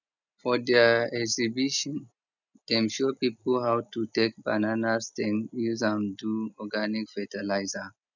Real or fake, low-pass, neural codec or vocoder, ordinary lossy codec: real; 7.2 kHz; none; none